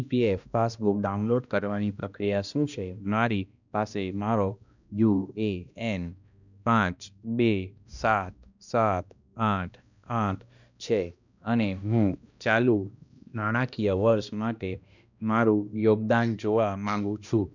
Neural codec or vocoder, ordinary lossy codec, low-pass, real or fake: codec, 16 kHz, 1 kbps, X-Codec, HuBERT features, trained on balanced general audio; none; 7.2 kHz; fake